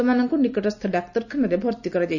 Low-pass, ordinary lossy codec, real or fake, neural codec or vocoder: 7.2 kHz; none; real; none